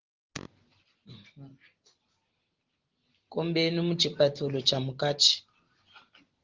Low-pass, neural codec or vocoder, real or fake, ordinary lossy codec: 7.2 kHz; none; real; Opus, 16 kbps